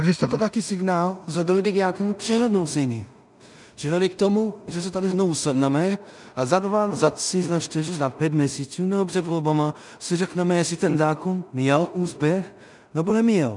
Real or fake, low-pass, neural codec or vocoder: fake; 10.8 kHz; codec, 16 kHz in and 24 kHz out, 0.4 kbps, LongCat-Audio-Codec, two codebook decoder